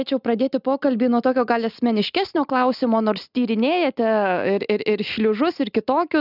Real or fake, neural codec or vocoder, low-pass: real; none; 5.4 kHz